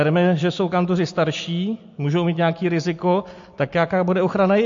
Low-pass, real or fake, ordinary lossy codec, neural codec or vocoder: 7.2 kHz; real; MP3, 48 kbps; none